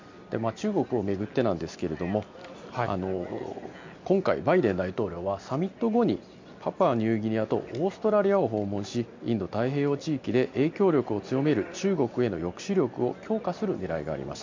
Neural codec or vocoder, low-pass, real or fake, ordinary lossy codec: none; 7.2 kHz; real; MP3, 64 kbps